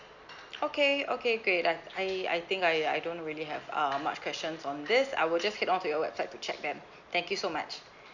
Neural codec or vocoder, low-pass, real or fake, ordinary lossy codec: none; 7.2 kHz; real; none